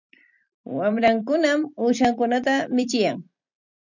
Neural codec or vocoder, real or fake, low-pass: vocoder, 44.1 kHz, 128 mel bands every 256 samples, BigVGAN v2; fake; 7.2 kHz